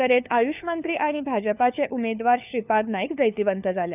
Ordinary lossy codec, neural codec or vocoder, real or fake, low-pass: none; codec, 16 kHz, 4 kbps, FunCodec, trained on LibriTTS, 50 frames a second; fake; 3.6 kHz